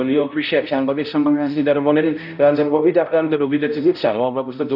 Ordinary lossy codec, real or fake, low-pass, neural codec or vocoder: none; fake; 5.4 kHz; codec, 16 kHz, 0.5 kbps, X-Codec, HuBERT features, trained on balanced general audio